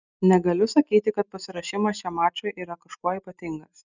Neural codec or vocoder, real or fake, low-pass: none; real; 7.2 kHz